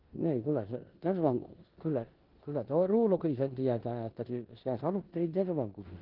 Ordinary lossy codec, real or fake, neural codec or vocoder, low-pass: Opus, 16 kbps; fake; codec, 16 kHz in and 24 kHz out, 0.9 kbps, LongCat-Audio-Codec, four codebook decoder; 5.4 kHz